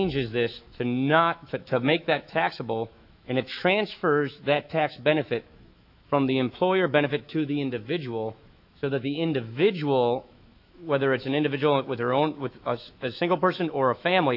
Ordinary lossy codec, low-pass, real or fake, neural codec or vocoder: AAC, 48 kbps; 5.4 kHz; fake; autoencoder, 48 kHz, 128 numbers a frame, DAC-VAE, trained on Japanese speech